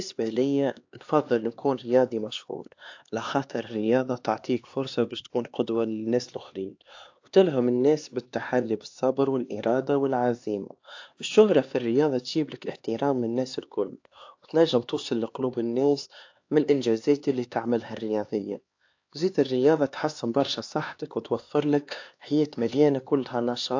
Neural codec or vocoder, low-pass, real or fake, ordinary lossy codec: codec, 16 kHz, 2 kbps, X-Codec, HuBERT features, trained on LibriSpeech; 7.2 kHz; fake; AAC, 48 kbps